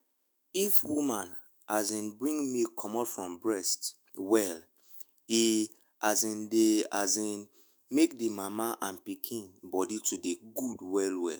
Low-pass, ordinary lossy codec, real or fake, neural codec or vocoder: none; none; fake; autoencoder, 48 kHz, 128 numbers a frame, DAC-VAE, trained on Japanese speech